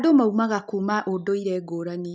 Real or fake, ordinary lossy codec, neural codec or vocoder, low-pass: real; none; none; none